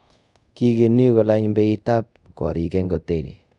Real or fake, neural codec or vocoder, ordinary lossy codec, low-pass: fake; codec, 24 kHz, 0.5 kbps, DualCodec; none; 10.8 kHz